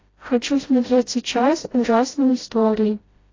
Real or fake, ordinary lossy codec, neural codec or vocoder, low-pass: fake; AAC, 32 kbps; codec, 16 kHz, 0.5 kbps, FreqCodec, smaller model; 7.2 kHz